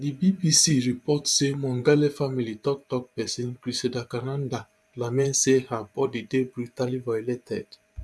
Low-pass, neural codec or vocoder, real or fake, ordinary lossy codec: none; vocoder, 24 kHz, 100 mel bands, Vocos; fake; none